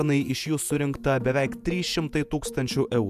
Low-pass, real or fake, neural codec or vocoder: 14.4 kHz; fake; vocoder, 48 kHz, 128 mel bands, Vocos